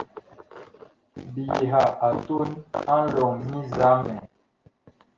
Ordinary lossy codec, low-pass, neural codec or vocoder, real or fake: Opus, 16 kbps; 7.2 kHz; none; real